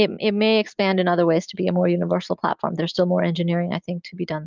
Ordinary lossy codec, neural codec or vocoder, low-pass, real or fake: Opus, 32 kbps; none; 7.2 kHz; real